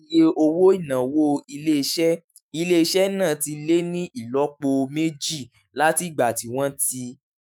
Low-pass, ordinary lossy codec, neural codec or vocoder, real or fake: none; none; autoencoder, 48 kHz, 128 numbers a frame, DAC-VAE, trained on Japanese speech; fake